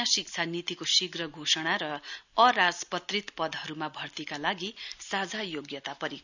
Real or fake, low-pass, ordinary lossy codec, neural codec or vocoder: real; 7.2 kHz; none; none